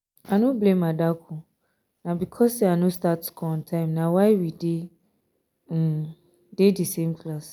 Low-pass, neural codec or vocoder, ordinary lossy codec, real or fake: none; none; none; real